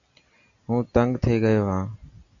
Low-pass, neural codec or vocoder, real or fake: 7.2 kHz; none; real